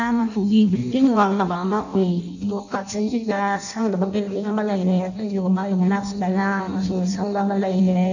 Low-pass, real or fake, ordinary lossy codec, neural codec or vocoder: 7.2 kHz; fake; none; codec, 16 kHz in and 24 kHz out, 0.6 kbps, FireRedTTS-2 codec